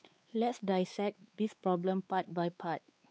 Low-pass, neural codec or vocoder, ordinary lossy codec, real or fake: none; codec, 16 kHz, 4 kbps, X-Codec, WavLM features, trained on Multilingual LibriSpeech; none; fake